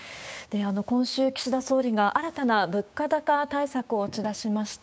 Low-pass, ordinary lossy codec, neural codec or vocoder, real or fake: none; none; codec, 16 kHz, 6 kbps, DAC; fake